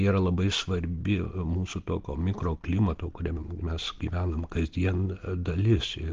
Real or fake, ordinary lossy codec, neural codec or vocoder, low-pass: real; Opus, 16 kbps; none; 7.2 kHz